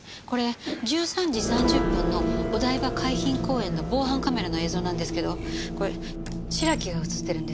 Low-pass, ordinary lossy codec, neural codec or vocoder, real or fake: none; none; none; real